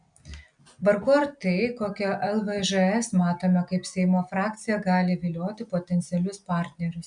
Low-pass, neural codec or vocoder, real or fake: 9.9 kHz; none; real